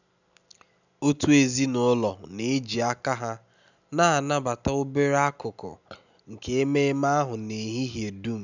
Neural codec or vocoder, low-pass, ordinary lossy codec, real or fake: none; 7.2 kHz; none; real